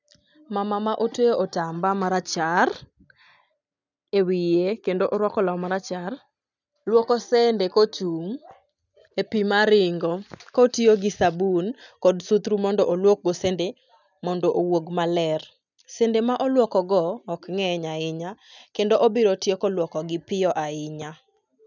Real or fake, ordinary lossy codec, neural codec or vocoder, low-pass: real; none; none; 7.2 kHz